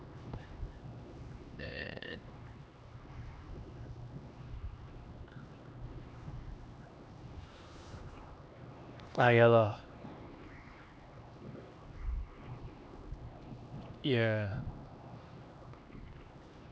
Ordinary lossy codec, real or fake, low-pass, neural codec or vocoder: none; fake; none; codec, 16 kHz, 2 kbps, X-Codec, HuBERT features, trained on LibriSpeech